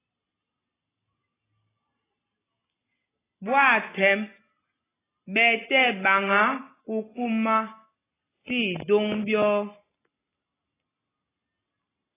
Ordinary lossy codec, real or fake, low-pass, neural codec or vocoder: AAC, 16 kbps; real; 3.6 kHz; none